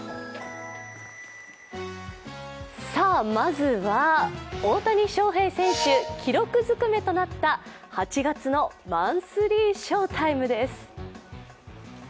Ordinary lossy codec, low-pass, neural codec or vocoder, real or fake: none; none; none; real